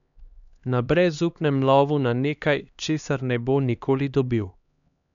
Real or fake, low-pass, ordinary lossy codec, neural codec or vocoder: fake; 7.2 kHz; none; codec, 16 kHz, 2 kbps, X-Codec, HuBERT features, trained on LibriSpeech